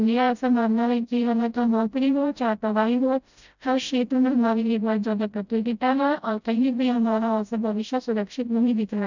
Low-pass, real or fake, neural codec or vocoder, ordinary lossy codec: 7.2 kHz; fake; codec, 16 kHz, 0.5 kbps, FreqCodec, smaller model; none